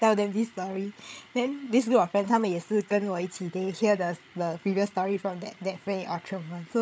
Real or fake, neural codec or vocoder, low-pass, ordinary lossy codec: fake; codec, 16 kHz, 8 kbps, FreqCodec, larger model; none; none